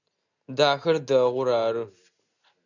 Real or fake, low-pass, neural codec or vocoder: real; 7.2 kHz; none